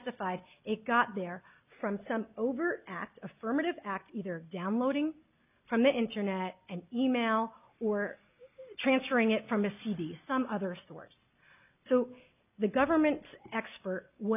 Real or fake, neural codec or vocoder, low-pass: real; none; 3.6 kHz